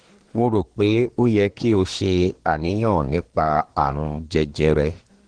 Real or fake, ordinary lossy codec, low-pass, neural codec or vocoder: fake; Opus, 16 kbps; 9.9 kHz; codec, 24 kHz, 1 kbps, SNAC